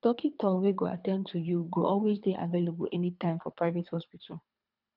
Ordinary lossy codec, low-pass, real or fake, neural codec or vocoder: none; 5.4 kHz; fake; codec, 24 kHz, 3 kbps, HILCodec